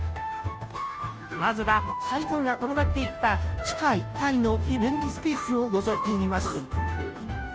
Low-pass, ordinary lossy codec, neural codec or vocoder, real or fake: none; none; codec, 16 kHz, 0.5 kbps, FunCodec, trained on Chinese and English, 25 frames a second; fake